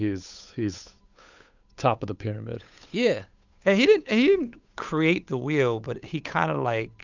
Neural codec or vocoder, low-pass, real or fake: codec, 16 kHz, 8 kbps, FunCodec, trained on Chinese and English, 25 frames a second; 7.2 kHz; fake